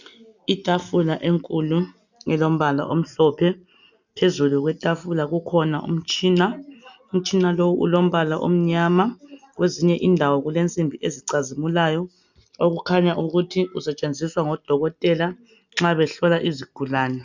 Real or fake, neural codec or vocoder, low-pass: real; none; 7.2 kHz